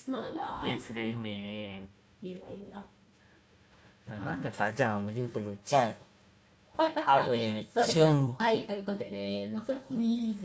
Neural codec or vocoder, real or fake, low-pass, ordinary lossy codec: codec, 16 kHz, 1 kbps, FunCodec, trained on Chinese and English, 50 frames a second; fake; none; none